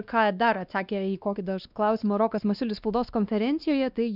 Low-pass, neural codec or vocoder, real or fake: 5.4 kHz; codec, 16 kHz, 2 kbps, X-Codec, WavLM features, trained on Multilingual LibriSpeech; fake